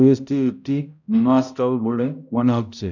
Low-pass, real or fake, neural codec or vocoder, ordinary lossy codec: 7.2 kHz; fake; codec, 16 kHz, 0.5 kbps, X-Codec, HuBERT features, trained on balanced general audio; none